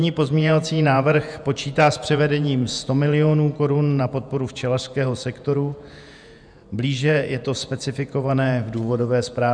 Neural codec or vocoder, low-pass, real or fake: vocoder, 48 kHz, 128 mel bands, Vocos; 9.9 kHz; fake